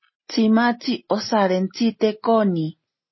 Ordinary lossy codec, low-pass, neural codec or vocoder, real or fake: MP3, 24 kbps; 7.2 kHz; none; real